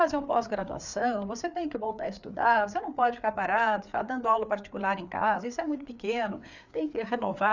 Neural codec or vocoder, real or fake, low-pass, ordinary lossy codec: codec, 16 kHz, 4 kbps, FreqCodec, larger model; fake; 7.2 kHz; none